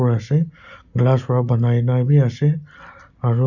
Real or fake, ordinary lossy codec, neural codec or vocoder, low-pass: real; none; none; 7.2 kHz